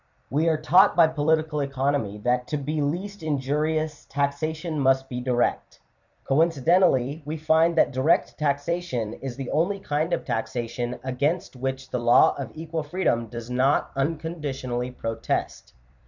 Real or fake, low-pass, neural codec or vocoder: fake; 7.2 kHz; vocoder, 44.1 kHz, 128 mel bands every 256 samples, BigVGAN v2